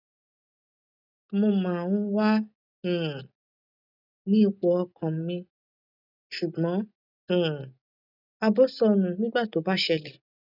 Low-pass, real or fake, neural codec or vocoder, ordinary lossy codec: 5.4 kHz; real; none; none